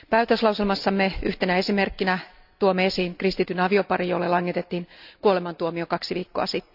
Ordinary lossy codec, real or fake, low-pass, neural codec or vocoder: none; real; 5.4 kHz; none